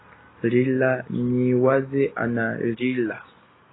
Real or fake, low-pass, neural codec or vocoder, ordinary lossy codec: real; 7.2 kHz; none; AAC, 16 kbps